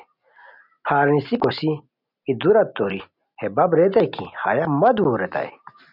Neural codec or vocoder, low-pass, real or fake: none; 5.4 kHz; real